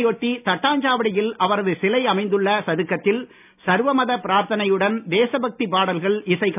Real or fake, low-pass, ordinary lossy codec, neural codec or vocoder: real; 3.6 kHz; none; none